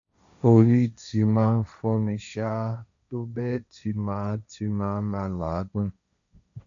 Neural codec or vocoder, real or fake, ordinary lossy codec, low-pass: codec, 16 kHz, 1.1 kbps, Voila-Tokenizer; fake; none; 7.2 kHz